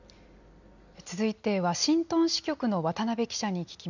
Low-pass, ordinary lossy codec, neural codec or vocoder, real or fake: 7.2 kHz; none; none; real